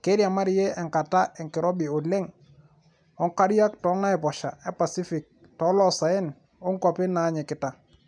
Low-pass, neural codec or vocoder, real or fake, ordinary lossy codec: 9.9 kHz; none; real; none